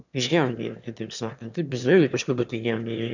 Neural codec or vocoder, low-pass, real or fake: autoencoder, 22.05 kHz, a latent of 192 numbers a frame, VITS, trained on one speaker; 7.2 kHz; fake